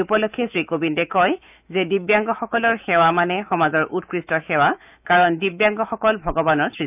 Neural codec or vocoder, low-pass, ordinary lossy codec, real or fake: autoencoder, 48 kHz, 128 numbers a frame, DAC-VAE, trained on Japanese speech; 3.6 kHz; none; fake